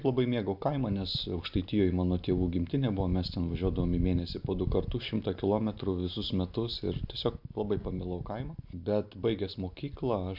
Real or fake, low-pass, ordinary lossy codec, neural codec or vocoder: real; 5.4 kHz; AAC, 48 kbps; none